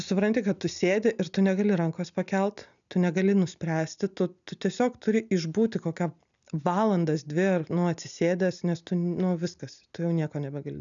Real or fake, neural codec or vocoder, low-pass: real; none; 7.2 kHz